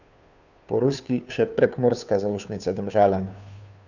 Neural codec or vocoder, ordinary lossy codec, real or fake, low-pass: codec, 16 kHz, 2 kbps, FunCodec, trained on Chinese and English, 25 frames a second; none; fake; 7.2 kHz